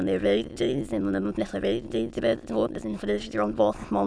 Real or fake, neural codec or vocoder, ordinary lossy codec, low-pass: fake; autoencoder, 22.05 kHz, a latent of 192 numbers a frame, VITS, trained on many speakers; none; none